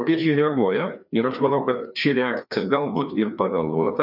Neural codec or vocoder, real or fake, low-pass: codec, 16 kHz, 2 kbps, FreqCodec, larger model; fake; 5.4 kHz